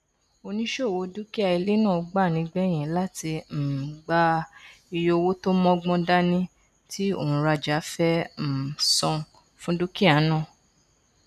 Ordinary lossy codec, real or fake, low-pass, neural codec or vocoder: none; real; 14.4 kHz; none